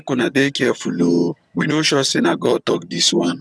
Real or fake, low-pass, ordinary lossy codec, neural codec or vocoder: fake; none; none; vocoder, 22.05 kHz, 80 mel bands, HiFi-GAN